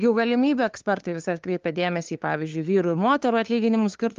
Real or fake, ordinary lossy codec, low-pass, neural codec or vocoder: fake; Opus, 32 kbps; 7.2 kHz; codec, 16 kHz, 2 kbps, FunCodec, trained on LibriTTS, 25 frames a second